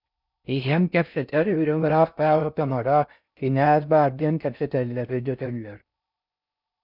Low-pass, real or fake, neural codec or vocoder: 5.4 kHz; fake; codec, 16 kHz in and 24 kHz out, 0.6 kbps, FocalCodec, streaming, 4096 codes